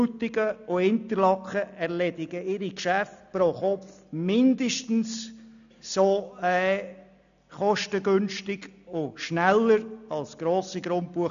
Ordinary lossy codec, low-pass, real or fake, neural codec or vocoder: none; 7.2 kHz; real; none